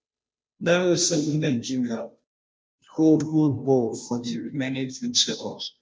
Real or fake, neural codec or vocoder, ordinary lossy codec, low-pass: fake; codec, 16 kHz, 0.5 kbps, FunCodec, trained on Chinese and English, 25 frames a second; none; none